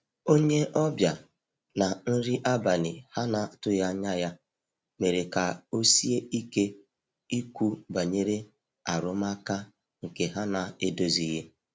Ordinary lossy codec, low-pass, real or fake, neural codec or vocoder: none; none; real; none